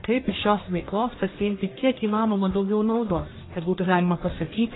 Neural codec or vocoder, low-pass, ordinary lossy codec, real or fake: codec, 44.1 kHz, 1.7 kbps, Pupu-Codec; 7.2 kHz; AAC, 16 kbps; fake